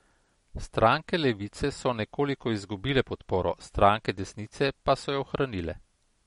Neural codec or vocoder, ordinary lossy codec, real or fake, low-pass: none; MP3, 48 kbps; real; 14.4 kHz